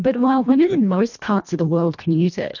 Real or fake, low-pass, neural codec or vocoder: fake; 7.2 kHz; codec, 24 kHz, 1.5 kbps, HILCodec